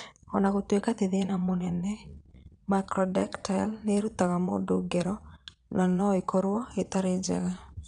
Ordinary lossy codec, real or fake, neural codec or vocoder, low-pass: none; fake; vocoder, 22.05 kHz, 80 mel bands, Vocos; 9.9 kHz